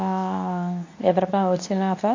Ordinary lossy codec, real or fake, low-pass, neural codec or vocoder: AAC, 48 kbps; fake; 7.2 kHz; codec, 24 kHz, 0.9 kbps, WavTokenizer, medium speech release version 2